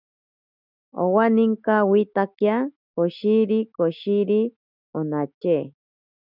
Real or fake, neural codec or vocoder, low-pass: real; none; 5.4 kHz